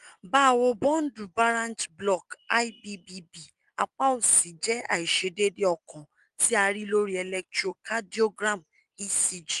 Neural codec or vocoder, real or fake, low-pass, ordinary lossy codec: none; real; 10.8 kHz; Opus, 32 kbps